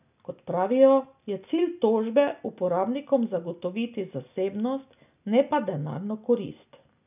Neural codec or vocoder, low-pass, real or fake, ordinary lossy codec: none; 3.6 kHz; real; none